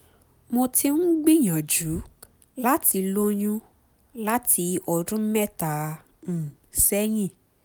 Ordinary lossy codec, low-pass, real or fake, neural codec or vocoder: none; none; real; none